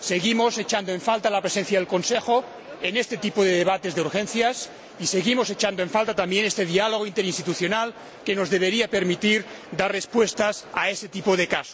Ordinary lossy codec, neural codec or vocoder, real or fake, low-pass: none; none; real; none